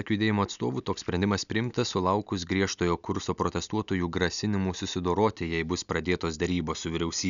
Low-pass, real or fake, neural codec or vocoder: 7.2 kHz; real; none